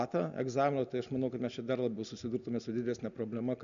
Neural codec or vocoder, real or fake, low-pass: none; real; 7.2 kHz